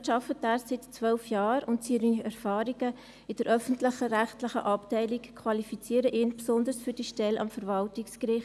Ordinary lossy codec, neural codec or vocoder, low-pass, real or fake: none; none; none; real